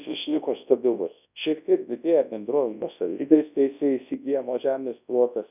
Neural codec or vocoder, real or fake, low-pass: codec, 24 kHz, 0.9 kbps, WavTokenizer, large speech release; fake; 3.6 kHz